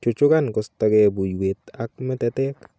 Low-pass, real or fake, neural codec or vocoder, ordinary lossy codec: none; real; none; none